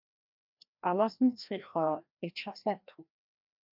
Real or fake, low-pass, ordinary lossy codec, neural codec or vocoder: fake; 5.4 kHz; MP3, 48 kbps; codec, 16 kHz, 1 kbps, FreqCodec, larger model